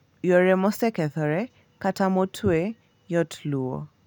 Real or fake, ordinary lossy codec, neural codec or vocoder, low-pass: real; none; none; 19.8 kHz